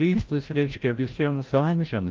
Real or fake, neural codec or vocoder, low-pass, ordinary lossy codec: fake; codec, 16 kHz, 0.5 kbps, FreqCodec, larger model; 7.2 kHz; Opus, 32 kbps